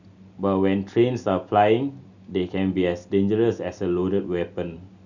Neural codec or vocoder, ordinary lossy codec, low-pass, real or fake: none; none; 7.2 kHz; real